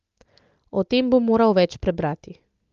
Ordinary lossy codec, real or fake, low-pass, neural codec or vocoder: Opus, 32 kbps; real; 7.2 kHz; none